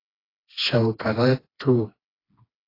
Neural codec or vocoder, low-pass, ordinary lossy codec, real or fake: codec, 16 kHz, 2 kbps, FreqCodec, smaller model; 5.4 kHz; AAC, 24 kbps; fake